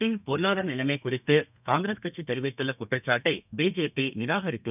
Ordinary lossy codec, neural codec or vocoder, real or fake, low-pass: none; codec, 32 kHz, 1.9 kbps, SNAC; fake; 3.6 kHz